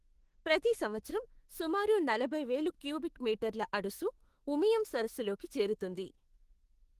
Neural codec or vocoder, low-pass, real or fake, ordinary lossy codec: autoencoder, 48 kHz, 32 numbers a frame, DAC-VAE, trained on Japanese speech; 14.4 kHz; fake; Opus, 16 kbps